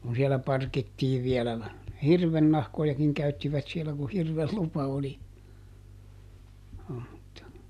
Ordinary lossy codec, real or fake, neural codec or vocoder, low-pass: none; real; none; 14.4 kHz